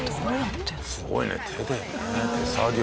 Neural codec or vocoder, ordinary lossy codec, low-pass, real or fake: none; none; none; real